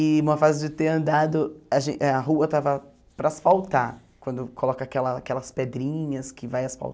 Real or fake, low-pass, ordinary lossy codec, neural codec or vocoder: real; none; none; none